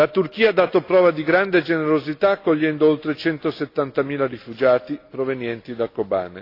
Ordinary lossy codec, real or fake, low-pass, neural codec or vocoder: AAC, 32 kbps; real; 5.4 kHz; none